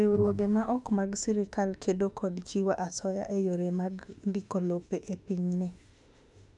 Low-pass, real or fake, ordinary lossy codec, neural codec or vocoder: 10.8 kHz; fake; none; autoencoder, 48 kHz, 32 numbers a frame, DAC-VAE, trained on Japanese speech